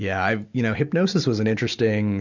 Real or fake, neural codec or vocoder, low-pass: real; none; 7.2 kHz